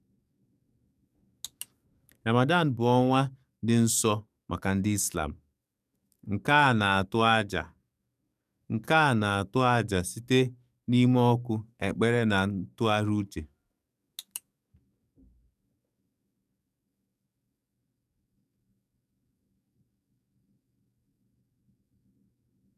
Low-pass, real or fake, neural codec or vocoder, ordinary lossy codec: 14.4 kHz; fake; codec, 44.1 kHz, 7.8 kbps, DAC; none